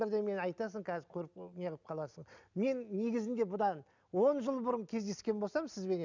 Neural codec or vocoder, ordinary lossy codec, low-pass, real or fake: none; none; 7.2 kHz; real